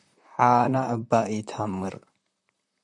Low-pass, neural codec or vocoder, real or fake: 10.8 kHz; vocoder, 44.1 kHz, 128 mel bands, Pupu-Vocoder; fake